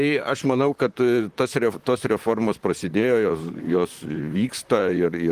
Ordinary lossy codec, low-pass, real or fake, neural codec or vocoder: Opus, 24 kbps; 14.4 kHz; fake; vocoder, 44.1 kHz, 128 mel bands, Pupu-Vocoder